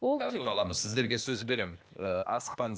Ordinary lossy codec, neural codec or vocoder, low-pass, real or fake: none; codec, 16 kHz, 0.8 kbps, ZipCodec; none; fake